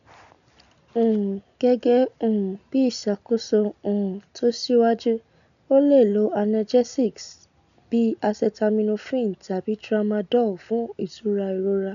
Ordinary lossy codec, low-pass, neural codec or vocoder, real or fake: none; 7.2 kHz; none; real